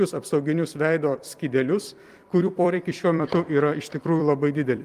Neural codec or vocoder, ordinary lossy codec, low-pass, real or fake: vocoder, 44.1 kHz, 128 mel bands every 256 samples, BigVGAN v2; Opus, 32 kbps; 14.4 kHz; fake